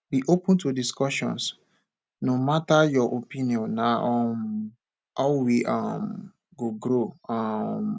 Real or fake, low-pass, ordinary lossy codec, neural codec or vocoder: real; none; none; none